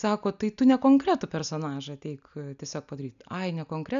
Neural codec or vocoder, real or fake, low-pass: none; real; 7.2 kHz